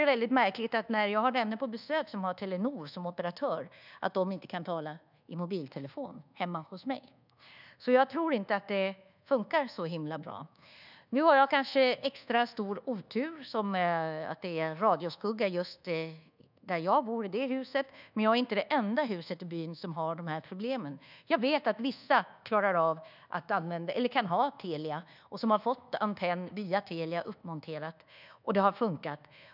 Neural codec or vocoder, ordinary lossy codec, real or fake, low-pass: codec, 24 kHz, 1.2 kbps, DualCodec; none; fake; 5.4 kHz